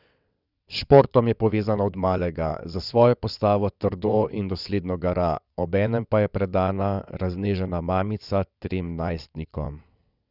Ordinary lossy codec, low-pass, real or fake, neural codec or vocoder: none; 5.4 kHz; fake; vocoder, 22.05 kHz, 80 mel bands, WaveNeXt